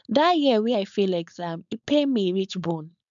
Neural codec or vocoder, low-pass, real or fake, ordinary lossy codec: codec, 16 kHz, 4.8 kbps, FACodec; 7.2 kHz; fake; MP3, 64 kbps